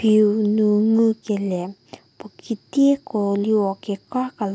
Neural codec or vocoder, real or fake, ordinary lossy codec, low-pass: none; real; none; none